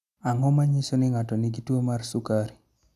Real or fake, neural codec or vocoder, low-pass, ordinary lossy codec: real; none; 14.4 kHz; none